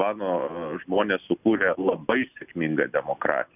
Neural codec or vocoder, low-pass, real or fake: none; 3.6 kHz; real